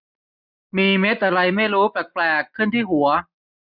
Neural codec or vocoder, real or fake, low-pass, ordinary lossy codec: none; real; 5.4 kHz; none